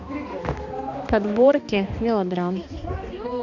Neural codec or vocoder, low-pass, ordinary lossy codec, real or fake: codec, 16 kHz, 2 kbps, X-Codec, HuBERT features, trained on balanced general audio; 7.2 kHz; none; fake